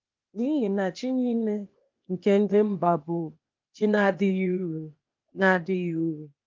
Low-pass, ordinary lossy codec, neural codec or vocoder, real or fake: 7.2 kHz; Opus, 24 kbps; codec, 16 kHz, 0.8 kbps, ZipCodec; fake